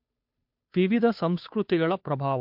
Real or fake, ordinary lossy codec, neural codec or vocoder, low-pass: fake; MP3, 48 kbps; codec, 16 kHz, 2 kbps, FunCodec, trained on Chinese and English, 25 frames a second; 5.4 kHz